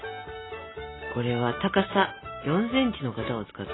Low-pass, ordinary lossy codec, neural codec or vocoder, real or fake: 7.2 kHz; AAC, 16 kbps; none; real